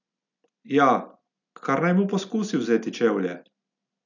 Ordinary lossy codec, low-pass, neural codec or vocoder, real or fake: none; 7.2 kHz; none; real